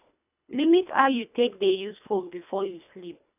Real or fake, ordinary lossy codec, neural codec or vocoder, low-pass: fake; none; codec, 24 kHz, 1.5 kbps, HILCodec; 3.6 kHz